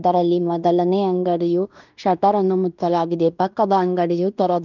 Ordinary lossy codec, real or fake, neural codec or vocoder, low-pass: none; fake; codec, 16 kHz in and 24 kHz out, 0.9 kbps, LongCat-Audio-Codec, fine tuned four codebook decoder; 7.2 kHz